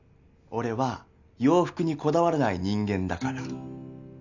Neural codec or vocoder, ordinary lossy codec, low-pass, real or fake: none; none; 7.2 kHz; real